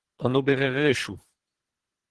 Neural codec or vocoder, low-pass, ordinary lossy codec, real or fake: codec, 24 kHz, 3 kbps, HILCodec; 10.8 kHz; Opus, 16 kbps; fake